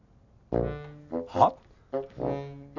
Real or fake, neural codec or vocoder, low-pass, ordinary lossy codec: real; none; 7.2 kHz; none